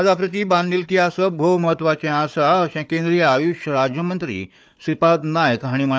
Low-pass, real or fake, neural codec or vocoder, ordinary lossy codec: none; fake; codec, 16 kHz, 4 kbps, FunCodec, trained on Chinese and English, 50 frames a second; none